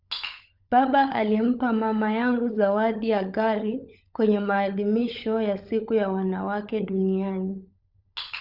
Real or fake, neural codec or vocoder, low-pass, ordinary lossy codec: fake; codec, 16 kHz, 16 kbps, FunCodec, trained on LibriTTS, 50 frames a second; 5.4 kHz; none